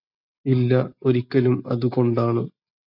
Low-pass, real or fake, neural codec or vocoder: 5.4 kHz; real; none